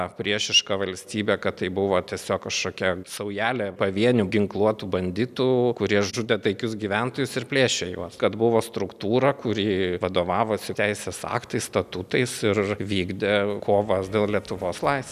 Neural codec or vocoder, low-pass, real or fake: none; 14.4 kHz; real